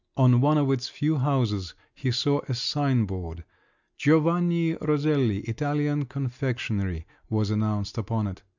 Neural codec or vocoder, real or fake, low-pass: none; real; 7.2 kHz